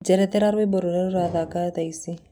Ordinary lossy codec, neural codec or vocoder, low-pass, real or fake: none; none; 19.8 kHz; real